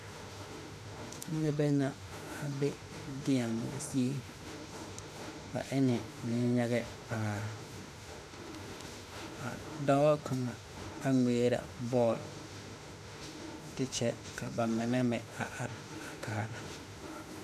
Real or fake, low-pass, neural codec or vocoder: fake; 14.4 kHz; autoencoder, 48 kHz, 32 numbers a frame, DAC-VAE, trained on Japanese speech